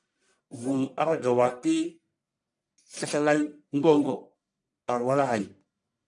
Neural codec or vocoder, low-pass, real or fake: codec, 44.1 kHz, 1.7 kbps, Pupu-Codec; 10.8 kHz; fake